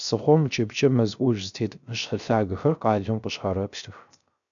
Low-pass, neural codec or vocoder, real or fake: 7.2 kHz; codec, 16 kHz, 0.3 kbps, FocalCodec; fake